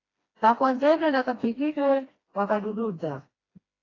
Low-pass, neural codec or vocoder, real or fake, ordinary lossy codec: 7.2 kHz; codec, 16 kHz, 1 kbps, FreqCodec, smaller model; fake; AAC, 32 kbps